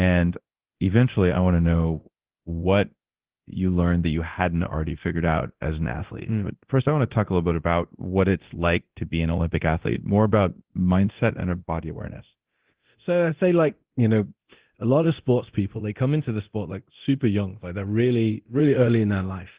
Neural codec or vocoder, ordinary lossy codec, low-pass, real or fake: codec, 24 kHz, 0.9 kbps, DualCodec; Opus, 16 kbps; 3.6 kHz; fake